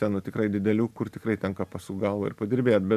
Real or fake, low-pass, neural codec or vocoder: real; 14.4 kHz; none